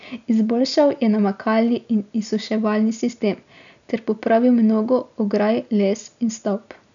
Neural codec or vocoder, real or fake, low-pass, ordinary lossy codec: none; real; 7.2 kHz; none